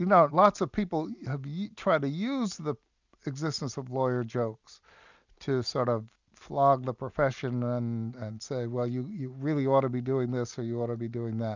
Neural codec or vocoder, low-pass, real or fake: none; 7.2 kHz; real